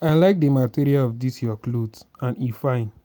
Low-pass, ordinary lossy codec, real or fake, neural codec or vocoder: none; none; real; none